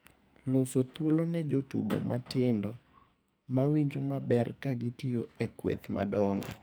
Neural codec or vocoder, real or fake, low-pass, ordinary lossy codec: codec, 44.1 kHz, 2.6 kbps, SNAC; fake; none; none